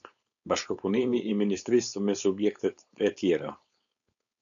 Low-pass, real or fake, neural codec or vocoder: 7.2 kHz; fake; codec, 16 kHz, 4.8 kbps, FACodec